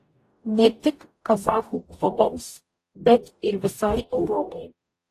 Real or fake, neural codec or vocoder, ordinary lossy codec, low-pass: fake; codec, 44.1 kHz, 0.9 kbps, DAC; AAC, 48 kbps; 14.4 kHz